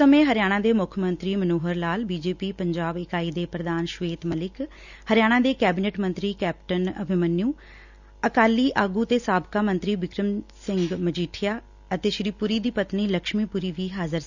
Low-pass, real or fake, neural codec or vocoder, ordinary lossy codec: 7.2 kHz; real; none; none